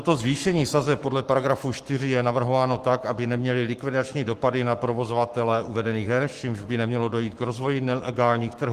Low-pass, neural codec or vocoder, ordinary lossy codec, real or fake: 14.4 kHz; codec, 44.1 kHz, 7.8 kbps, Pupu-Codec; Opus, 24 kbps; fake